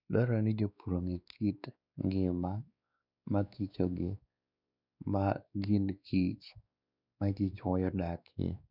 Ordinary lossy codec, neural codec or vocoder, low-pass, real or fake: none; codec, 16 kHz, 2 kbps, X-Codec, WavLM features, trained on Multilingual LibriSpeech; 5.4 kHz; fake